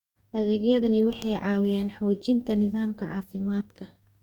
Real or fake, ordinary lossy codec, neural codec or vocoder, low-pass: fake; Opus, 64 kbps; codec, 44.1 kHz, 2.6 kbps, DAC; 19.8 kHz